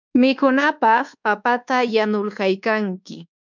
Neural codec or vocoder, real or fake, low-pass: codec, 24 kHz, 1.2 kbps, DualCodec; fake; 7.2 kHz